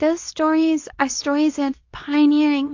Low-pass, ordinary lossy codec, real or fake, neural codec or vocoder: 7.2 kHz; AAC, 48 kbps; fake; codec, 24 kHz, 0.9 kbps, WavTokenizer, medium speech release version 2